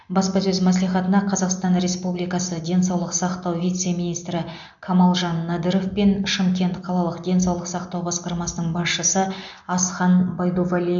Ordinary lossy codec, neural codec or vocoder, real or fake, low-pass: MP3, 64 kbps; none; real; 7.2 kHz